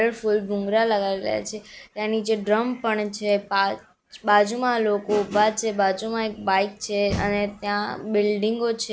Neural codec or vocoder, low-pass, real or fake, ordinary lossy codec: none; none; real; none